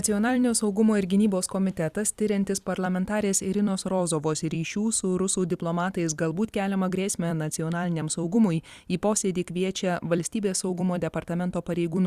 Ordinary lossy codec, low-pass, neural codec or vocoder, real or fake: Opus, 64 kbps; 14.4 kHz; vocoder, 44.1 kHz, 128 mel bands every 256 samples, BigVGAN v2; fake